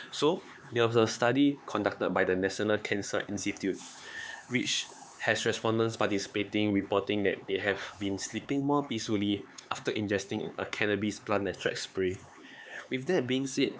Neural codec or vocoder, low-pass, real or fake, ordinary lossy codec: codec, 16 kHz, 4 kbps, X-Codec, HuBERT features, trained on LibriSpeech; none; fake; none